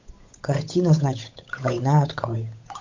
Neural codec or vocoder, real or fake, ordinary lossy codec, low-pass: codec, 16 kHz, 8 kbps, FunCodec, trained on Chinese and English, 25 frames a second; fake; MP3, 48 kbps; 7.2 kHz